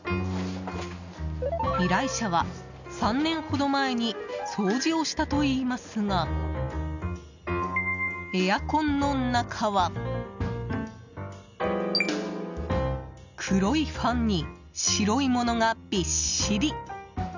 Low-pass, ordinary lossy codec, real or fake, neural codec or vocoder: 7.2 kHz; none; real; none